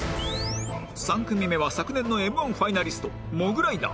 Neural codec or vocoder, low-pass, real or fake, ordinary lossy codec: none; none; real; none